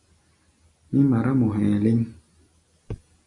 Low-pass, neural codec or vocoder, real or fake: 10.8 kHz; none; real